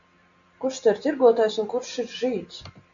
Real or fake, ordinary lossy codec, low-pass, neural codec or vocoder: real; AAC, 64 kbps; 7.2 kHz; none